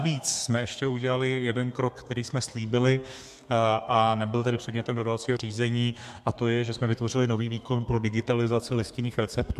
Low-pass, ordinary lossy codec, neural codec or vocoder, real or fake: 14.4 kHz; MP3, 96 kbps; codec, 32 kHz, 1.9 kbps, SNAC; fake